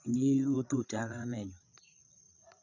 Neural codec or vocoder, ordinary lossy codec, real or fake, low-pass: codec, 16 kHz, 8 kbps, FreqCodec, larger model; none; fake; 7.2 kHz